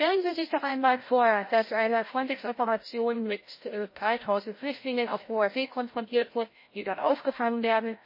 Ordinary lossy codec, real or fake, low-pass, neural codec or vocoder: MP3, 24 kbps; fake; 5.4 kHz; codec, 16 kHz, 0.5 kbps, FreqCodec, larger model